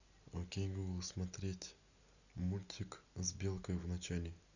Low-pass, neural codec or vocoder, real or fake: 7.2 kHz; none; real